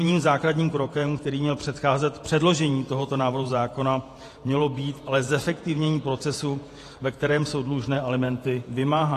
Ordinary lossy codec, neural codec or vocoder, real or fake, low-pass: AAC, 48 kbps; vocoder, 48 kHz, 128 mel bands, Vocos; fake; 14.4 kHz